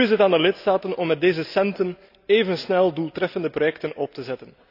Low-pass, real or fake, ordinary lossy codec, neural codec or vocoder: 5.4 kHz; real; AAC, 48 kbps; none